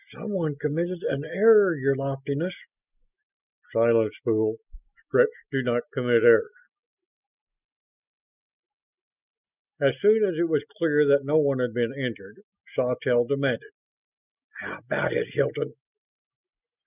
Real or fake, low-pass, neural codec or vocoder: real; 3.6 kHz; none